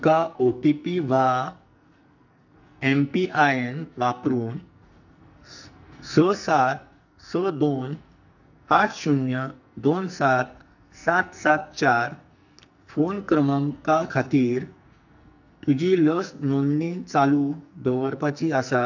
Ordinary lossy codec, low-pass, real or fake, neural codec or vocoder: none; 7.2 kHz; fake; codec, 44.1 kHz, 2.6 kbps, SNAC